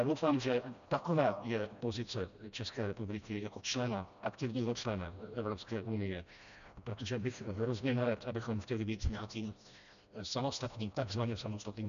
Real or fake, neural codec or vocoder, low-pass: fake; codec, 16 kHz, 1 kbps, FreqCodec, smaller model; 7.2 kHz